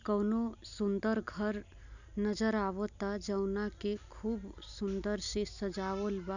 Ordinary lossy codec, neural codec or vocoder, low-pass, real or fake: none; none; 7.2 kHz; real